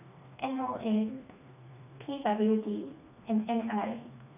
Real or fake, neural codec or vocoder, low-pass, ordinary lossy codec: fake; codec, 16 kHz, 2 kbps, FreqCodec, smaller model; 3.6 kHz; none